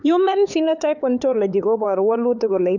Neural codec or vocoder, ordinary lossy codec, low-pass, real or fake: codec, 16 kHz, 4 kbps, X-Codec, HuBERT features, trained on LibriSpeech; none; 7.2 kHz; fake